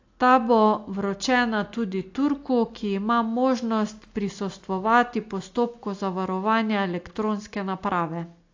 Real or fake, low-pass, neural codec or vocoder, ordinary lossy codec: real; 7.2 kHz; none; AAC, 48 kbps